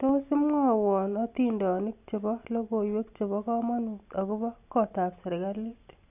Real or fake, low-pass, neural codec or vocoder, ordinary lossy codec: real; 3.6 kHz; none; none